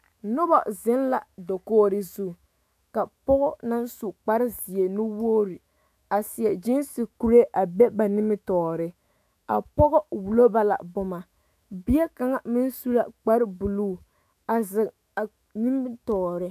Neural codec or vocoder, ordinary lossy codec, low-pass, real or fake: autoencoder, 48 kHz, 128 numbers a frame, DAC-VAE, trained on Japanese speech; MP3, 96 kbps; 14.4 kHz; fake